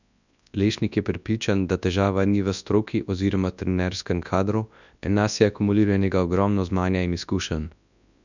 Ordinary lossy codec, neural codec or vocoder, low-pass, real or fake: none; codec, 24 kHz, 0.9 kbps, WavTokenizer, large speech release; 7.2 kHz; fake